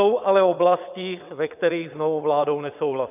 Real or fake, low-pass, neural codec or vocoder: fake; 3.6 kHz; vocoder, 22.05 kHz, 80 mel bands, WaveNeXt